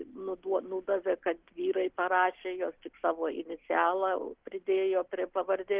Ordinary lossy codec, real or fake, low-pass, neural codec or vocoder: Opus, 16 kbps; real; 3.6 kHz; none